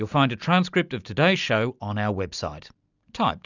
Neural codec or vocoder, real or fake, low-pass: none; real; 7.2 kHz